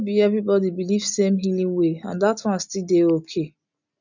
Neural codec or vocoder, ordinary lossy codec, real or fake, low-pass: none; none; real; 7.2 kHz